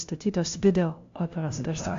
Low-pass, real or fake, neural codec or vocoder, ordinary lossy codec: 7.2 kHz; fake; codec, 16 kHz, 0.5 kbps, FunCodec, trained on LibriTTS, 25 frames a second; AAC, 48 kbps